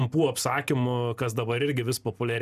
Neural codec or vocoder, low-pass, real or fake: none; 14.4 kHz; real